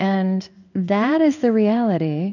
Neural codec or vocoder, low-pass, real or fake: codec, 16 kHz in and 24 kHz out, 1 kbps, XY-Tokenizer; 7.2 kHz; fake